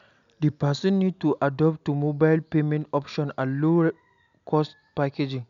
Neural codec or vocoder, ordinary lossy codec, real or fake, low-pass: none; none; real; 7.2 kHz